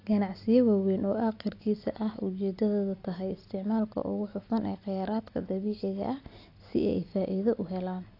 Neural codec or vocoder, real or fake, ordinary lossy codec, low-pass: none; real; AAC, 32 kbps; 5.4 kHz